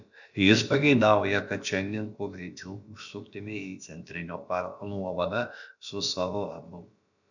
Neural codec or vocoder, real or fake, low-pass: codec, 16 kHz, about 1 kbps, DyCAST, with the encoder's durations; fake; 7.2 kHz